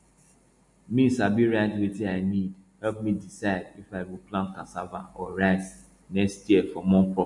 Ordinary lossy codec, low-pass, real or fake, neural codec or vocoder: MP3, 48 kbps; 10.8 kHz; real; none